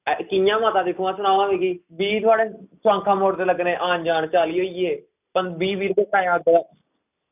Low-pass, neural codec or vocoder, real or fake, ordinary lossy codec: 3.6 kHz; none; real; none